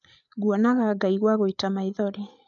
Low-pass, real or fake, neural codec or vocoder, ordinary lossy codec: 7.2 kHz; fake; codec, 16 kHz, 8 kbps, FreqCodec, larger model; none